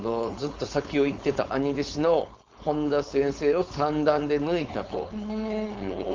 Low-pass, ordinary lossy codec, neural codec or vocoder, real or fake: 7.2 kHz; Opus, 32 kbps; codec, 16 kHz, 4.8 kbps, FACodec; fake